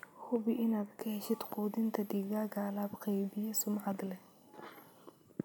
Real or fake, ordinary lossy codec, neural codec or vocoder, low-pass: real; none; none; none